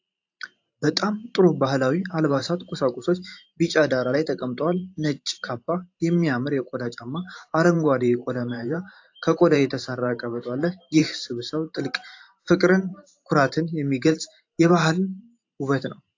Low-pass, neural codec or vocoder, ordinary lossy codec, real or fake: 7.2 kHz; vocoder, 44.1 kHz, 128 mel bands every 512 samples, BigVGAN v2; AAC, 48 kbps; fake